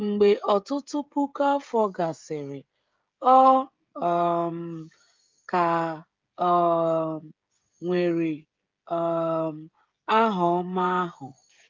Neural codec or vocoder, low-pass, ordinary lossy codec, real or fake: codec, 16 kHz, 16 kbps, FreqCodec, smaller model; 7.2 kHz; Opus, 24 kbps; fake